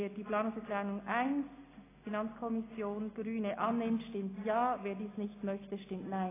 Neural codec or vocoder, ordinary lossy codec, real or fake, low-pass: none; AAC, 16 kbps; real; 3.6 kHz